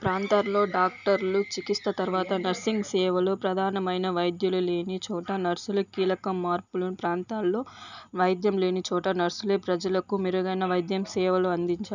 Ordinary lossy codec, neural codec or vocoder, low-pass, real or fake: none; none; 7.2 kHz; real